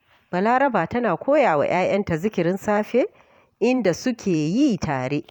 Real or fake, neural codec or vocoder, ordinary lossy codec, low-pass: fake; vocoder, 44.1 kHz, 128 mel bands every 512 samples, BigVGAN v2; none; 19.8 kHz